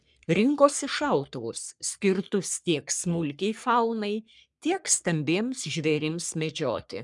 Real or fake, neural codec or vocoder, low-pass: fake; codec, 44.1 kHz, 3.4 kbps, Pupu-Codec; 10.8 kHz